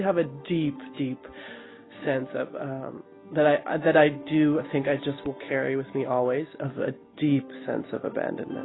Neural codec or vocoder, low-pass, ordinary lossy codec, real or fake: none; 7.2 kHz; AAC, 16 kbps; real